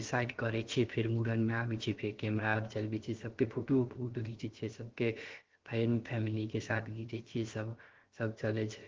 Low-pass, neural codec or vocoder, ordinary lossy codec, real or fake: 7.2 kHz; codec, 16 kHz, about 1 kbps, DyCAST, with the encoder's durations; Opus, 16 kbps; fake